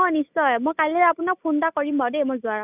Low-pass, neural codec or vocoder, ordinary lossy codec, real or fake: 3.6 kHz; none; none; real